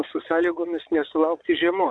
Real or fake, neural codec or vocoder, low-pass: fake; vocoder, 22.05 kHz, 80 mel bands, Vocos; 9.9 kHz